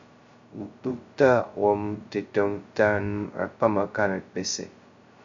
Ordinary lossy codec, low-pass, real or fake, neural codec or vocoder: Opus, 64 kbps; 7.2 kHz; fake; codec, 16 kHz, 0.2 kbps, FocalCodec